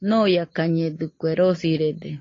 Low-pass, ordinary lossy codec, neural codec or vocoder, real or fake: 7.2 kHz; AAC, 32 kbps; none; real